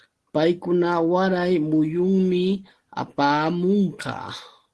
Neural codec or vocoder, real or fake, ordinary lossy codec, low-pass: none; real; Opus, 16 kbps; 10.8 kHz